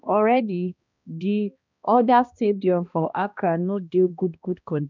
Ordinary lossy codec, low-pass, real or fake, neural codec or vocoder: none; 7.2 kHz; fake; codec, 16 kHz, 1 kbps, X-Codec, HuBERT features, trained on balanced general audio